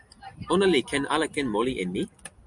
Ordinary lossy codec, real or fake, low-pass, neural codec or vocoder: MP3, 96 kbps; fake; 10.8 kHz; vocoder, 44.1 kHz, 128 mel bands every 256 samples, BigVGAN v2